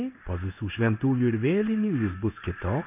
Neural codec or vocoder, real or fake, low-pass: codec, 16 kHz in and 24 kHz out, 1 kbps, XY-Tokenizer; fake; 3.6 kHz